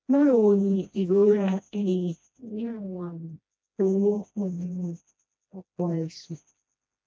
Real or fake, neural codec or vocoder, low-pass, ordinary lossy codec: fake; codec, 16 kHz, 1 kbps, FreqCodec, smaller model; none; none